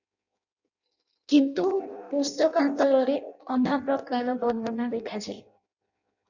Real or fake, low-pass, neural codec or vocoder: fake; 7.2 kHz; codec, 16 kHz in and 24 kHz out, 0.6 kbps, FireRedTTS-2 codec